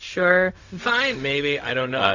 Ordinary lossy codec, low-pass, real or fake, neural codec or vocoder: AAC, 48 kbps; 7.2 kHz; fake; codec, 16 kHz, 0.4 kbps, LongCat-Audio-Codec